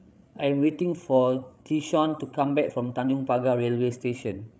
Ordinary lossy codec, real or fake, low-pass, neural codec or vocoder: none; fake; none; codec, 16 kHz, 16 kbps, FreqCodec, larger model